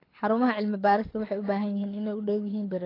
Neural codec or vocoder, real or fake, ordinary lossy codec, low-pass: codec, 24 kHz, 6 kbps, HILCodec; fake; AAC, 24 kbps; 5.4 kHz